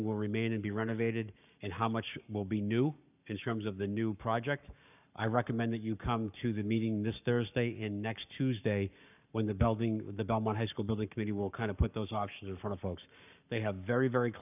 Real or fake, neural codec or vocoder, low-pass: fake; codec, 44.1 kHz, 7.8 kbps, Pupu-Codec; 3.6 kHz